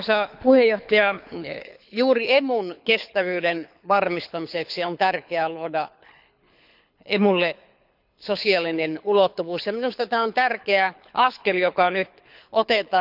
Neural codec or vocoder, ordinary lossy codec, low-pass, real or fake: codec, 24 kHz, 6 kbps, HILCodec; none; 5.4 kHz; fake